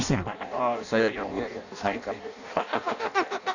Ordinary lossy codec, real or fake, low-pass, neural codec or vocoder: none; fake; 7.2 kHz; codec, 16 kHz in and 24 kHz out, 0.6 kbps, FireRedTTS-2 codec